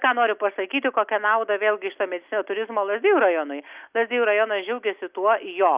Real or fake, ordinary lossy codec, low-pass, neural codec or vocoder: real; Opus, 64 kbps; 3.6 kHz; none